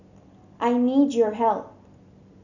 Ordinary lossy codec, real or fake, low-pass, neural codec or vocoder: none; real; 7.2 kHz; none